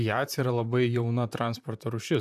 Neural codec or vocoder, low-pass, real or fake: vocoder, 44.1 kHz, 128 mel bands, Pupu-Vocoder; 14.4 kHz; fake